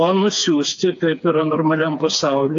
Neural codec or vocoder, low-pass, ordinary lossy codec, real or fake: codec, 16 kHz, 4 kbps, FreqCodec, smaller model; 7.2 kHz; AAC, 64 kbps; fake